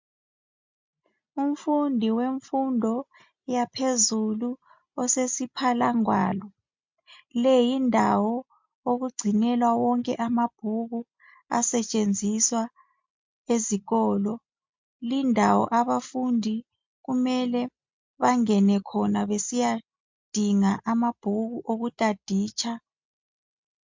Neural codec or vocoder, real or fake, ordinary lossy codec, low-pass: none; real; MP3, 64 kbps; 7.2 kHz